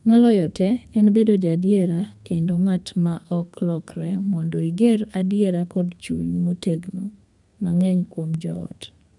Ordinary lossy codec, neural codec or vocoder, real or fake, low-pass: none; codec, 32 kHz, 1.9 kbps, SNAC; fake; 10.8 kHz